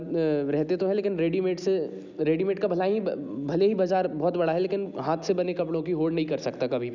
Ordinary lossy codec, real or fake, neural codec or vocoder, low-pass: none; real; none; 7.2 kHz